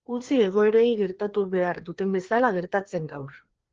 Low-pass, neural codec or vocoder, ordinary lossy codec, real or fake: 7.2 kHz; codec, 16 kHz, 2 kbps, FreqCodec, larger model; Opus, 16 kbps; fake